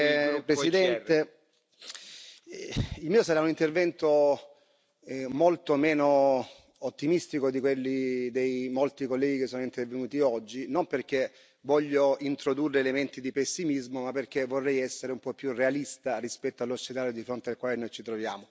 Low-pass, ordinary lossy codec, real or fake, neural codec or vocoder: none; none; real; none